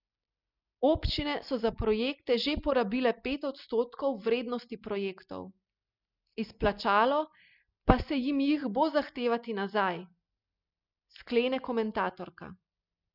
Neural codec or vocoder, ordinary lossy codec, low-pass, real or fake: none; none; 5.4 kHz; real